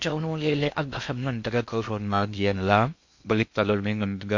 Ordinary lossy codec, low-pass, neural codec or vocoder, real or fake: MP3, 48 kbps; 7.2 kHz; codec, 16 kHz in and 24 kHz out, 0.6 kbps, FocalCodec, streaming, 2048 codes; fake